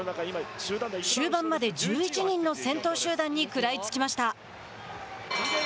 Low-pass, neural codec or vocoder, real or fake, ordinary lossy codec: none; none; real; none